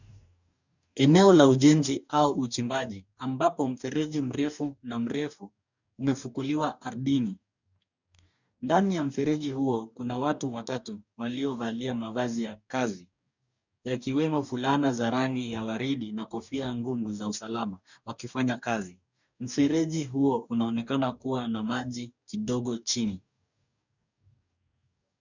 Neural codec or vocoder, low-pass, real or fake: codec, 44.1 kHz, 2.6 kbps, DAC; 7.2 kHz; fake